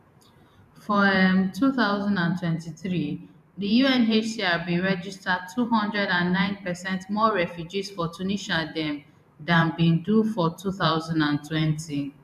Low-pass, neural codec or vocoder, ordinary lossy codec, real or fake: 14.4 kHz; vocoder, 44.1 kHz, 128 mel bands every 512 samples, BigVGAN v2; none; fake